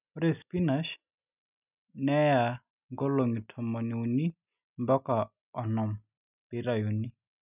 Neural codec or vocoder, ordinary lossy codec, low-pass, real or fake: none; none; 3.6 kHz; real